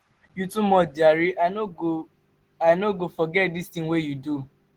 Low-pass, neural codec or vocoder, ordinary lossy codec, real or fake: 19.8 kHz; none; Opus, 16 kbps; real